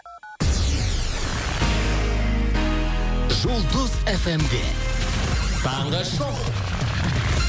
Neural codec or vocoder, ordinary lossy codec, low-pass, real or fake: none; none; none; real